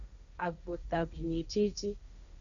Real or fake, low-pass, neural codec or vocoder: fake; 7.2 kHz; codec, 16 kHz, 1.1 kbps, Voila-Tokenizer